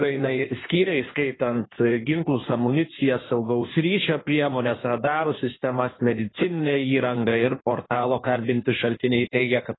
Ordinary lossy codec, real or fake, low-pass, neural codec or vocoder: AAC, 16 kbps; fake; 7.2 kHz; codec, 16 kHz in and 24 kHz out, 1.1 kbps, FireRedTTS-2 codec